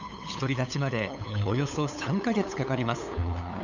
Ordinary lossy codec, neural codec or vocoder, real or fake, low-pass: none; codec, 16 kHz, 8 kbps, FunCodec, trained on LibriTTS, 25 frames a second; fake; 7.2 kHz